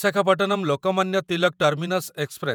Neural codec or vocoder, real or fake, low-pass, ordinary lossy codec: vocoder, 48 kHz, 128 mel bands, Vocos; fake; none; none